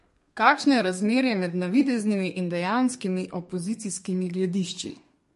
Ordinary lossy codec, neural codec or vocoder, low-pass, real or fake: MP3, 48 kbps; codec, 32 kHz, 1.9 kbps, SNAC; 14.4 kHz; fake